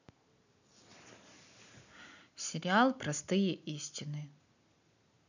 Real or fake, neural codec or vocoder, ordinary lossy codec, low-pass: real; none; none; 7.2 kHz